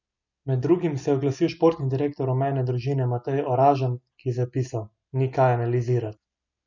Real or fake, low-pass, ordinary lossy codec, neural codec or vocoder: real; 7.2 kHz; none; none